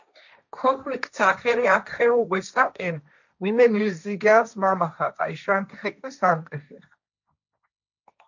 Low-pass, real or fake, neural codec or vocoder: 7.2 kHz; fake; codec, 16 kHz, 1.1 kbps, Voila-Tokenizer